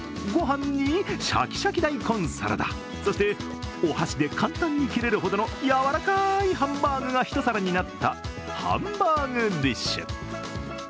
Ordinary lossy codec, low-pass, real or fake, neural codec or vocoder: none; none; real; none